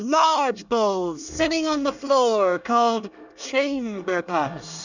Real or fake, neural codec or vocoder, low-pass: fake; codec, 24 kHz, 1 kbps, SNAC; 7.2 kHz